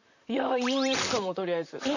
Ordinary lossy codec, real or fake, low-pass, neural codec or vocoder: none; fake; 7.2 kHz; vocoder, 44.1 kHz, 128 mel bands, Pupu-Vocoder